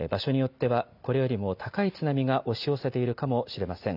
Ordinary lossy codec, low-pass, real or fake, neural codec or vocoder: none; 5.4 kHz; real; none